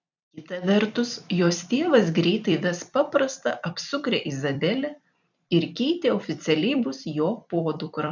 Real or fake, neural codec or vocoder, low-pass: fake; vocoder, 44.1 kHz, 128 mel bands every 256 samples, BigVGAN v2; 7.2 kHz